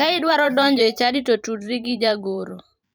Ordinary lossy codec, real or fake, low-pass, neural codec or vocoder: none; fake; none; vocoder, 44.1 kHz, 128 mel bands every 512 samples, BigVGAN v2